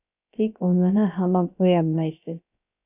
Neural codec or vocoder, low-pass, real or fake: codec, 16 kHz, 0.3 kbps, FocalCodec; 3.6 kHz; fake